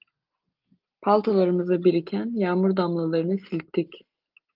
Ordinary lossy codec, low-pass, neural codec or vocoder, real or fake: Opus, 24 kbps; 5.4 kHz; none; real